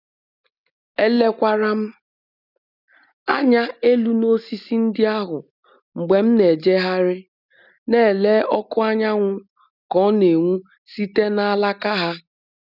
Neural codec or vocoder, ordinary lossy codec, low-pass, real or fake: none; none; 5.4 kHz; real